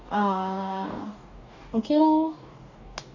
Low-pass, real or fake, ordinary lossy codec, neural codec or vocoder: 7.2 kHz; fake; none; codec, 44.1 kHz, 2.6 kbps, DAC